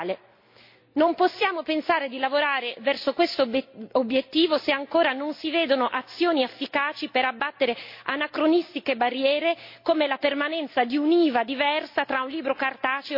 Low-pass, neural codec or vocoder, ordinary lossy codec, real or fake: 5.4 kHz; none; MP3, 32 kbps; real